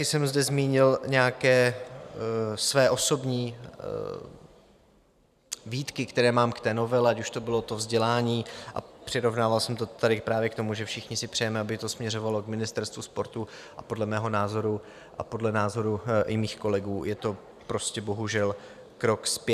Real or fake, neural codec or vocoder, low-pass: fake; vocoder, 44.1 kHz, 128 mel bands every 512 samples, BigVGAN v2; 14.4 kHz